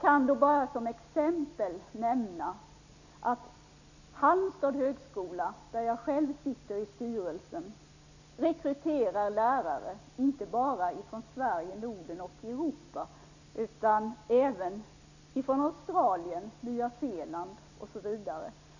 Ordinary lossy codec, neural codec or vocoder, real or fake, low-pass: none; none; real; 7.2 kHz